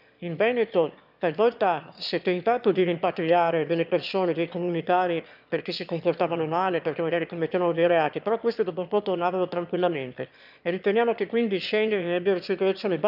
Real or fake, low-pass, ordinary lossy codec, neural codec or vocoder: fake; 5.4 kHz; none; autoencoder, 22.05 kHz, a latent of 192 numbers a frame, VITS, trained on one speaker